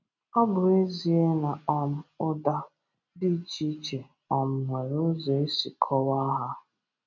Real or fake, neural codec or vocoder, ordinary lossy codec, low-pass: real; none; none; 7.2 kHz